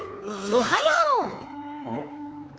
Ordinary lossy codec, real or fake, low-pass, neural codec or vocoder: none; fake; none; codec, 16 kHz, 4 kbps, X-Codec, WavLM features, trained on Multilingual LibriSpeech